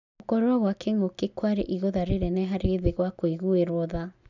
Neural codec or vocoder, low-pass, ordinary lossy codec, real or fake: none; 7.2 kHz; none; real